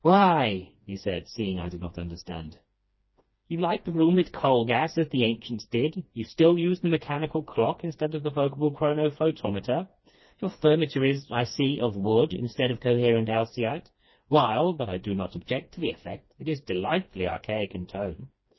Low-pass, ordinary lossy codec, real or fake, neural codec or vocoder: 7.2 kHz; MP3, 24 kbps; fake; codec, 16 kHz, 2 kbps, FreqCodec, smaller model